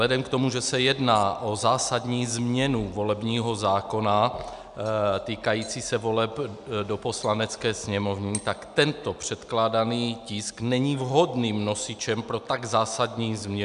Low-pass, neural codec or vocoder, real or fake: 10.8 kHz; none; real